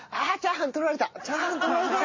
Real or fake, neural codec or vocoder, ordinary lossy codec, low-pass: real; none; MP3, 32 kbps; 7.2 kHz